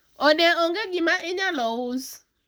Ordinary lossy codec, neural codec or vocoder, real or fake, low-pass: none; codec, 44.1 kHz, 7.8 kbps, Pupu-Codec; fake; none